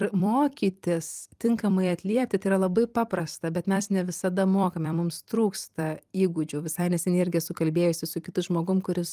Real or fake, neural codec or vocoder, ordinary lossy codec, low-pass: fake; vocoder, 44.1 kHz, 128 mel bands every 256 samples, BigVGAN v2; Opus, 32 kbps; 14.4 kHz